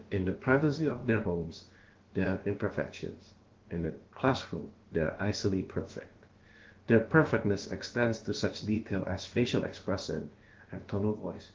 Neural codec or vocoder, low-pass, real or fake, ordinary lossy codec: codec, 16 kHz, about 1 kbps, DyCAST, with the encoder's durations; 7.2 kHz; fake; Opus, 16 kbps